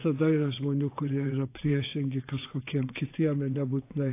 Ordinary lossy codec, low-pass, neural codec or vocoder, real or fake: AAC, 24 kbps; 3.6 kHz; vocoder, 22.05 kHz, 80 mel bands, WaveNeXt; fake